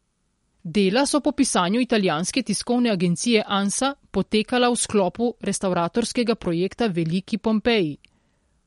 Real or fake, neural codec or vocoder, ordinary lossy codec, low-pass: real; none; MP3, 48 kbps; 19.8 kHz